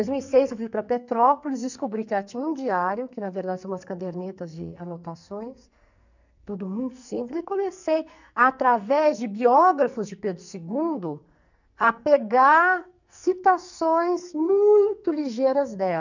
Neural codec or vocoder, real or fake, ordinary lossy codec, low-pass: codec, 44.1 kHz, 2.6 kbps, SNAC; fake; none; 7.2 kHz